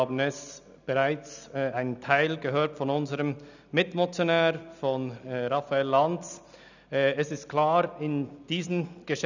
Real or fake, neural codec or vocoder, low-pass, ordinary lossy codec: real; none; 7.2 kHz; none